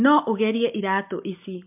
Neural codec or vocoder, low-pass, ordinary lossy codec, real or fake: none; 3.6 kHz; MP3, 32 kbps; real